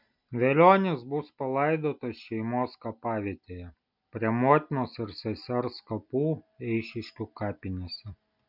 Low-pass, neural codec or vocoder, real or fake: 5.4 kHz; none; real